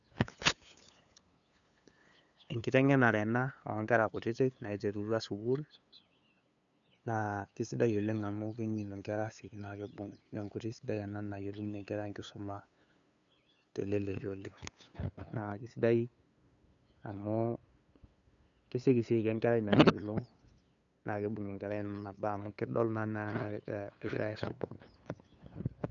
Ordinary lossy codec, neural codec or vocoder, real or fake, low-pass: none; codec, 16 kHz, 2 kbps, FunCodec, trained on LibriTTS, 25 frames a second; fake; 7.2 kHz